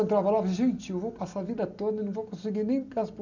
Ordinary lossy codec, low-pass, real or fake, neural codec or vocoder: none; 7.2 kHz; real; none